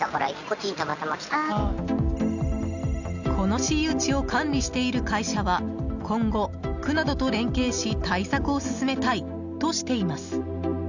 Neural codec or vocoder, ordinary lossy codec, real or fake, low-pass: none; none; real; 7.2 kHz